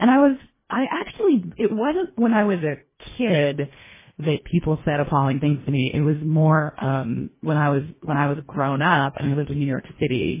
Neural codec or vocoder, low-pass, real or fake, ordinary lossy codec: codec, 24 kHz, 1.5 kbps, HILCodec; 3.6 kHz; fake; MP3, 16 kbps